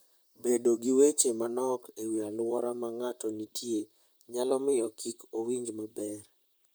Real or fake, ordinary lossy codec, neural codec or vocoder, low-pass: fake; none; vocoder, 44.1 kHz, 128 mel bands, Pupu-Vocoder; none